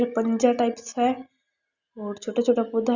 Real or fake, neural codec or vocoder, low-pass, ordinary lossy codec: real; none; 7.2 kHz; Opus, 64 kbps